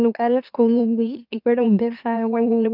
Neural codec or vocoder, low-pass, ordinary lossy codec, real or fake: autoencoder, 44.1 kHz, a latent of 192 numbers a frame, MeloTTS; 5.4 kHz; none; fake